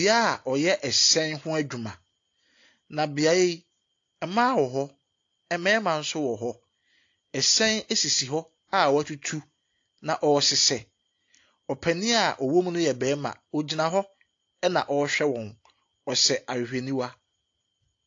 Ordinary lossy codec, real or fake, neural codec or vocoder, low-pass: AAC, 48 kbps; real; none; 7.2 kHz